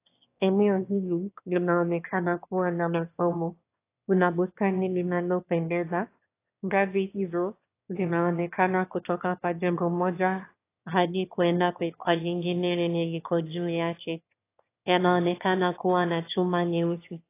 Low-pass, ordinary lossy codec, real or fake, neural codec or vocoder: 3.6 kHz; AAC, 24 kbps; fake; autoencoder, 22.05 kHz, a latent of 192 numbers a frame, VITS, trained on one speaker